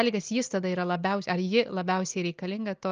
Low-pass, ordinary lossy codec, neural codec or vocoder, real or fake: 7.2 kHz; Opus, 24 kbps; none; real